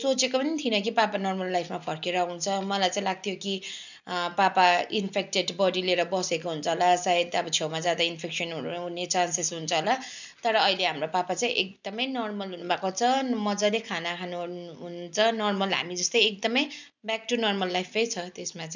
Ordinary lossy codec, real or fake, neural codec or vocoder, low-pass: none; real; none; 7.2 kHz